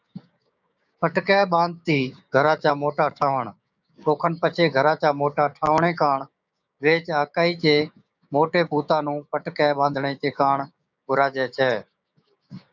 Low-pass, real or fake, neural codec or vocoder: 7.2 kHz; fake; codec, 16 kHz, 6 kbps, DAC